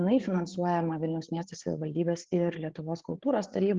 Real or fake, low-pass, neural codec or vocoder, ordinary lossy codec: fake; 7.2 kHz; codec, 16 kHz, 8 kbps, FreqCodec, larger model; Opus, 16 kbps